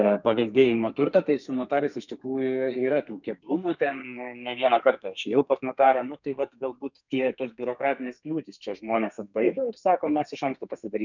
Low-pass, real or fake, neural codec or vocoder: 7.2 kHz; fake; codec, 32 kHz, 1.9 kbps, SNAC